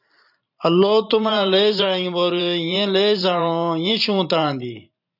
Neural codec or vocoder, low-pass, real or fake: vocoder, 44.1 kHz, 128 mel bands every 512 samples, BigVGAN v2; 5.4 kHz; fake